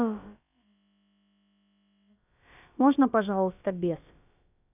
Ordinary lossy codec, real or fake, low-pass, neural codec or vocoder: none; fake; 3.6 kHz; codec, 16 kHz, about 1 kbps, DyCAST, with the encoder's durations